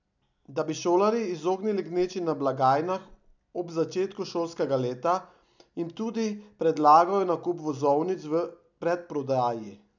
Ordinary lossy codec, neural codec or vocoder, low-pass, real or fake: none; none; 7.2 kHz; real